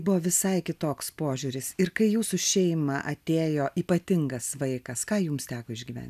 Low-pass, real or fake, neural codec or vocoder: 14.4 kHz; real; none